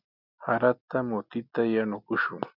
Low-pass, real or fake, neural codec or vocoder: 5.4 kHz; real; none